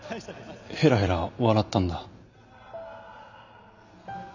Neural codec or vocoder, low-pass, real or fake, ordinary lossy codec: none; 7.2 kHz; real; none